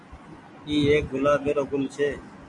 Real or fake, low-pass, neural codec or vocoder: real; 10.8 kHz; none